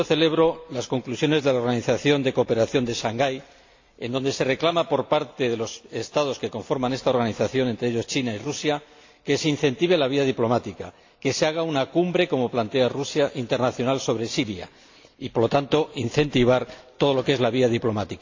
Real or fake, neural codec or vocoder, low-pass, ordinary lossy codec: real; none; 7.2 kHz; AAC, 48 kbps